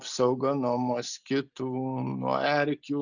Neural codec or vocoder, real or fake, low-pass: none; real; 7.2 kHz